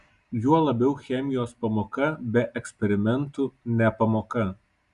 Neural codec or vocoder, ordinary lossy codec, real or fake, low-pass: none; Opus, 64 kbps; real; 10.8 kHz